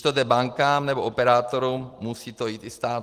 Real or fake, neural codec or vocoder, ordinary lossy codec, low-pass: real; none; Opus, 24 kbps; 14.4 kHz